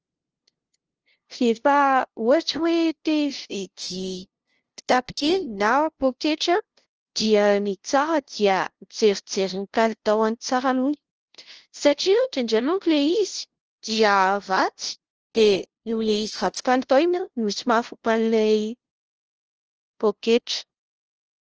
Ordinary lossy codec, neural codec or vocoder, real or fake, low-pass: Opus, 16 kbps; codec, 16 kHz, 0.5 kbps, FunCodec, trained on LibriTTS, 25 frames a second; fake; 7.2 kHz